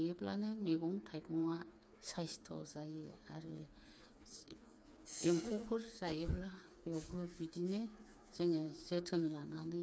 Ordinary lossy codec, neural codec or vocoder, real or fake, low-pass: none; codec, 16 kHz, 4 kbps, FreqCodec, smaller model; fake; none